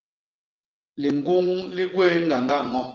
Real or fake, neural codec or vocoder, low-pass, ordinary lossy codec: fake; vocoder, 22.05 kHz, 80 mel bands, WaveNeXt; 7.2 kHz; Opus, 16 kbps